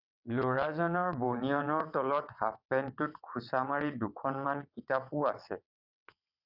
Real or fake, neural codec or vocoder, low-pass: fake; vocoder, 22.05 kHz, 80 mel bands, WaveNeXt; 5.4 kHz